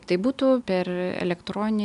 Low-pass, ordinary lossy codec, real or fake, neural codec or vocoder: 10.8 kHz; MP3, 96 kbps; real; none